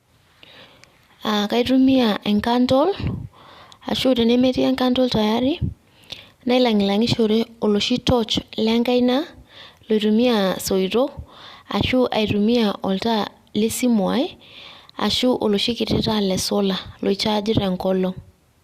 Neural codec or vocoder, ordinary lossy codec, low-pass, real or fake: none; Opus, 64 kbps; 14.4 kHz; real